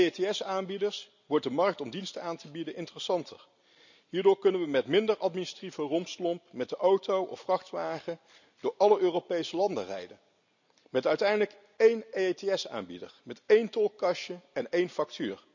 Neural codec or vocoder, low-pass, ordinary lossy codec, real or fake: none; 7.2 kHz; none; real